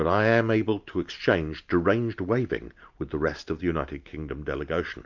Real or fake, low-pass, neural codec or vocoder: real; 7.2 kHz; none